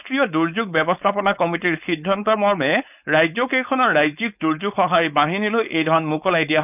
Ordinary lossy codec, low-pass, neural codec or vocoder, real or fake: none; 3.6 kHz; codec, 16 kHz, 4.8 kbps, FACodec; fake